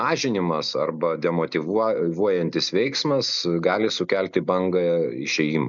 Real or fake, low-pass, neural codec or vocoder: real; 7.2 kHz; none